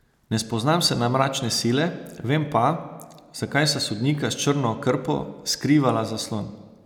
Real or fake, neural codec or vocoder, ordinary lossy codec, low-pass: real; none; none; 19.8 kHz